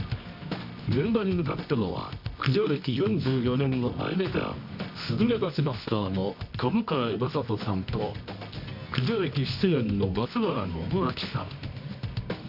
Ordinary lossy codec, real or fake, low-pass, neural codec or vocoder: none; fake; 5.4 kHz; codec, 24 kHz, 0.9 kbps, WavTokenizer, medium music audio release